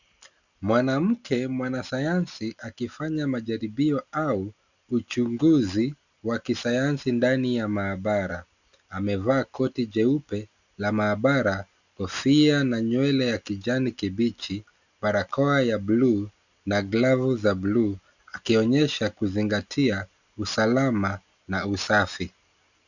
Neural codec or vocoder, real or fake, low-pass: none; real; 7.2 kHz